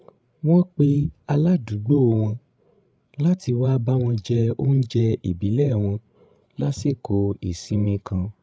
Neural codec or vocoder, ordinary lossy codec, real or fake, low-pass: codec, 16 kHz, 16 kbps, FreqCodec, larger model; none; fake; none